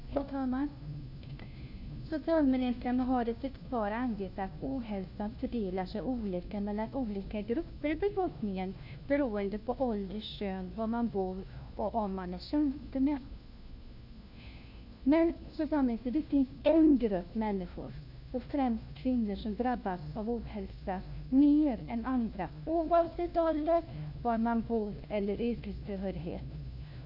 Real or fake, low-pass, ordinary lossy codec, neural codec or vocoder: fake; 5.4 kHz; none; codec, 16 kHz, 1 kbps, FunCodec, trained on LibriTTS, 50 frames a second